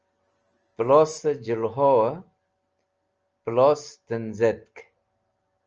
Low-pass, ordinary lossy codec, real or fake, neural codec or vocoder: 7.2 kHz; Opus, 24 kbps; real; none